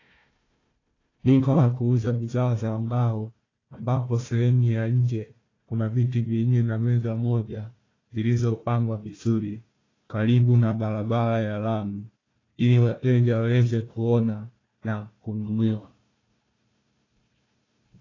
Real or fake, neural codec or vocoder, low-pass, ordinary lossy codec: fake; codec, 16 kHz, 1 kbps, FunCodec, trained on Chinese and English, 50 frames a second; 7.2 kHz; AAC, 32 kbps